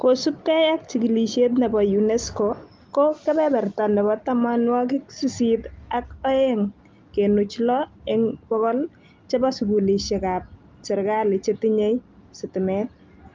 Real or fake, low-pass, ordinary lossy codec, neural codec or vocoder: real; 7.2 kHz; Opus, 24 kbps; none